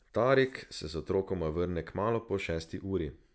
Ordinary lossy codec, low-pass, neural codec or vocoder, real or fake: none; none; none; real